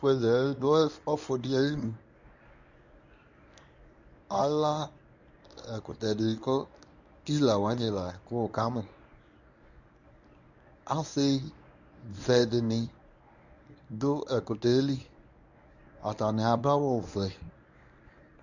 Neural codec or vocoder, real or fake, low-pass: codec, 24 kHz, 0.9 kbps, WavTokenizer, medium speech release version 1; fake; 7.2 kHz